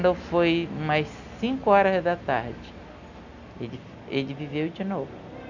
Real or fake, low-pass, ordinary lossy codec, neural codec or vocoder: real; 7.2 kHz; none; none